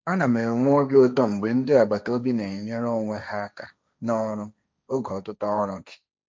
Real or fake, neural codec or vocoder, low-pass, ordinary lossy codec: fake; codec, 16 kHz, 1.1 kbps, Voila-Tokenizer; none; none